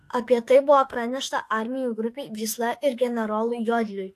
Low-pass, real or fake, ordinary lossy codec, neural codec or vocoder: 14.4 kHz; fake; AAC, 64 kbps; autoencoder, 48 kHz, 32 numbers a frame, DAC-VAE, trained on Japanese speech